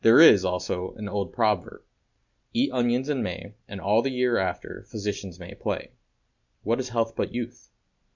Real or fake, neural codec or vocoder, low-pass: real; none; 7.2 kHz